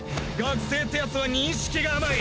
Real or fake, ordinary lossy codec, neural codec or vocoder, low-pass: real; none; none; none